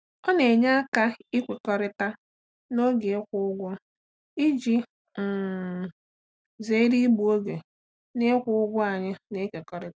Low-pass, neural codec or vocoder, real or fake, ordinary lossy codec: none; none; real; none